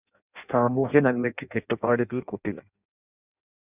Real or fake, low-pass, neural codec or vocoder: fake; 3.6 kHz; codec, 16 kHz in and 24 kHz out, 0.6 kbps, FireRedTTS-2 codec